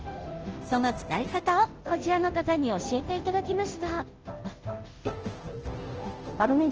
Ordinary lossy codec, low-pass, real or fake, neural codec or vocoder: Opus, 16 kbps; 7.2 kHz; fake; codec, 16 kHz, 0.5 kbps, FunCodec, trained on Chinese and English, 25 frames a second